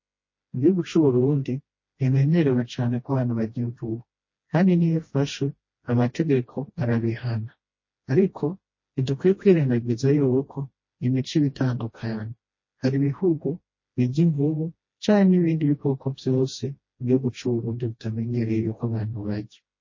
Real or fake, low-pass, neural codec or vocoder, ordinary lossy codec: fake; 7.2 kHz; codec, 16 kHz, 1 kbps, FreqCodec, smaller model; MP3, 32 kbps